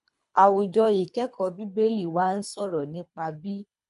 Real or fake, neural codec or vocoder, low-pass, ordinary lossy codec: fake; codec, 24 kHz, 3 kbps, HILCodec; 10.8 kHz; MP3, 64 kbps